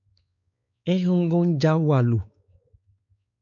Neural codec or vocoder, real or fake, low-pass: codec, 16 kHz, 4 kbps, X-Codec, WavLM features, trained on Multilingual LibriSpeech; fake; 7.2 kHz